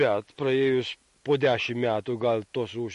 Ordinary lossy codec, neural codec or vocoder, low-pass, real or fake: MP3, 48 kbps; none; 14.4 kHz; real